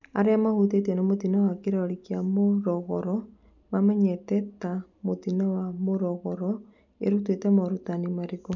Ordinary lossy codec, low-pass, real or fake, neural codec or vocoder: none; 7.2 kHz; real; none